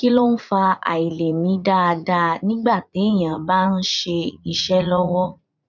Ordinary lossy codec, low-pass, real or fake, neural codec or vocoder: none; 7.2 kHz; fake; vocoder, 44.1 kHz, 80 mel bands, Vocos